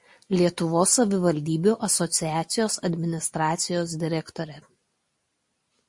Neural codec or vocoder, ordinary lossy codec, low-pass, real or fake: none; MP3, 48 kbps; 10.8 kHz; real